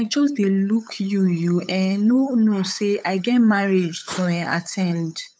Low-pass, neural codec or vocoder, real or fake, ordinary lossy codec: none; codec, 16 kHz, 8 kbps, FunCodec, trained on LibriTTS, 25 frames a second; fake; none